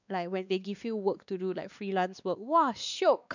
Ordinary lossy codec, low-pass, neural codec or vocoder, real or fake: none; 7.2 kHz; codec, 16 kHz, 2 kbps, X-Codec, WavLM features, trained on Multilingual LibriSpeech; fake